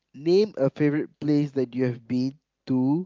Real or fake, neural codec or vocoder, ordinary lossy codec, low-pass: real; none; Opus, 32 kbps; 7.2 kHz